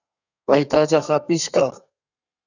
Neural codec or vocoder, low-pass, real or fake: codec, 32 kHz, 1.9 kbps, SNAC; 7.2 kHz; fake